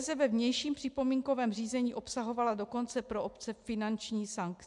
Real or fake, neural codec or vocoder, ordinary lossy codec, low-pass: real; none; MP3, 96 kbps; 10.8 kHz